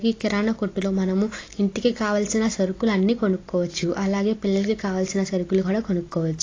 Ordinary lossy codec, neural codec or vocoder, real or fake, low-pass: AAC, 32 kbps; none; real; 7.2 kHz